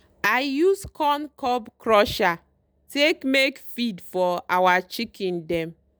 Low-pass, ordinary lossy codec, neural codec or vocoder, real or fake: none; none; none; real